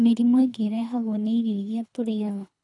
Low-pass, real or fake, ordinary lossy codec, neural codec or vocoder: 10.8 kHz; fake; none; codec, 24 kHz, 3 kbps, HILCodec